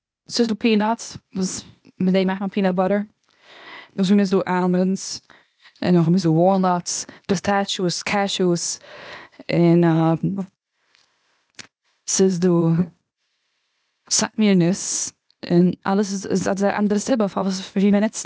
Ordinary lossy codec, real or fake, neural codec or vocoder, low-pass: none; fake; codec, 16 kHz, 0.8 kbps, ZipCodec; none